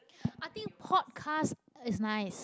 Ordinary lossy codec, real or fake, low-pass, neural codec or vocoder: none; real; none; none